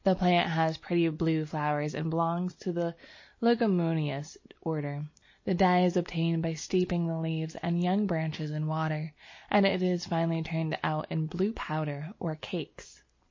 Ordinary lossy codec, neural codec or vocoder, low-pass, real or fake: MP3, 32 kbps; none; 7.2 kHz; real